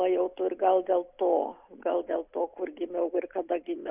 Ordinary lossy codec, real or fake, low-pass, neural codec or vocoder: Opus, 64 kbps; real; 3.6 kHz; none